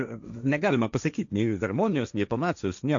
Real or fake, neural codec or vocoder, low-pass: fake; codec, 16 kHz, 1.1 kbps, Voila-Tokenizer; 7.2 kHz